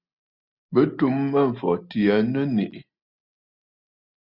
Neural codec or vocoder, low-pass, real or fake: none; 5.4 kHz; real